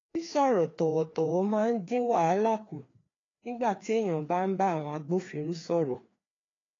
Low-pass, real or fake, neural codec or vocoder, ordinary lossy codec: 7.2 kHz; fake; codec, 16 kHz, 2 kbps, FreqCodec, larger model; AAC, 32 kbps